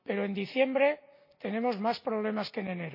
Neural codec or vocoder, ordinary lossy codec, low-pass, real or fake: none; AAC, 32 kbps; 5.4 kHz; real